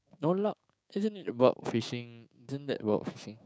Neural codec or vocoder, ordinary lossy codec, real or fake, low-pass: codec, 16 kHz, 6 kbps, DAC; none; fake; none